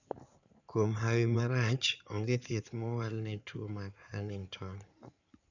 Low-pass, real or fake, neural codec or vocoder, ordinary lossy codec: 7.2 kHz; fake; codec, 16 kHz in and 24 kHz out, 2.2 kbps, FireRedTTS-2 codec; none